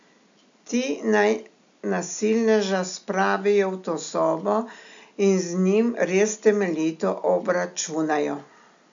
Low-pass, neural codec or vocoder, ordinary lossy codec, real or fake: 7.2 kHz; none; MP3, 64 kbps; real